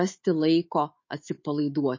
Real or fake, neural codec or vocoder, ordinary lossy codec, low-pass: fake; autoencoder, 48 kHz, 128 numbers a frame, DAC-VAE, trained on Japanese speech; MP3, 32 kbps; 7.2 kHz